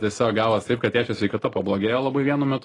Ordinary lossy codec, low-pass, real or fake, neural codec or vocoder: AAC, 32 kbps; 10.8 kHz; real; none